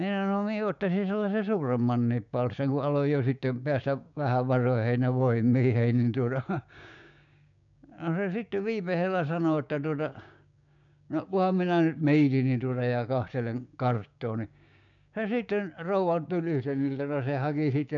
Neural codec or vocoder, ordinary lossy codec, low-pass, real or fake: codec, 16 kHz, 6 kbps, DAC; none; 7.2 kHz; fake